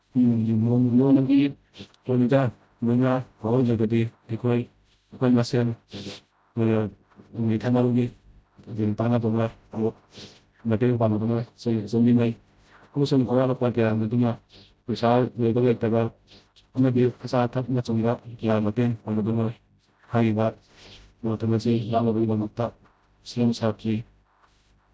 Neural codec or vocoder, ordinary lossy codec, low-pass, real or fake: codec, 16 kHz, 0.5 kbps, FreqCodec, smaller model; none; none; fake